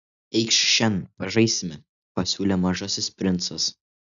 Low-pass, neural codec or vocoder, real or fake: 7.2 kHz; none; real